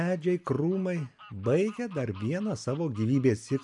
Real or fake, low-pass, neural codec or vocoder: real; 10.8 kHz; none